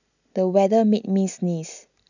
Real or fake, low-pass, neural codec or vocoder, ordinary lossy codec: real; 7.2 kHz; none; none